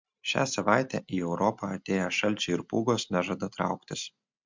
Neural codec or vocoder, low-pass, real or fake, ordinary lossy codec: none; 7.2 kHz; real; MP3, 64 kbps